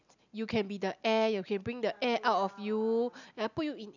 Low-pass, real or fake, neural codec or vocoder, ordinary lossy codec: 7.2 kHz; real; none; none